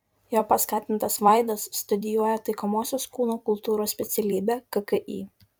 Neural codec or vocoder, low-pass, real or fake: vocoder, 44.1 kHz, 128 mel bands every 256 samples, BigVGAN v2; 19.8 kHz; fake